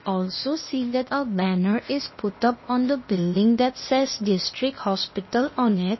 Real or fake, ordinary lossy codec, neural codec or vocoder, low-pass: fake; MP3, 24 kbps; codec, 16 kHz, 0.8 kbps, ZipCodec; 7.2 kHz